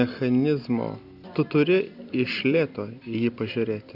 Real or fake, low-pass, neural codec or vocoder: real; 5.4 kHz; none